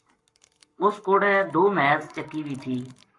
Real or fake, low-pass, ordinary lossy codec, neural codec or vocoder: fake; 10.8 kHz; MP3, 64 kbps; codec, 44.1 kHz, 7.8 kbps, Pupu-Codec